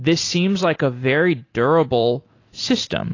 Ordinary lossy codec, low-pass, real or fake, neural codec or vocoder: AAC, 32 kbps; 7.2 kHz; real; none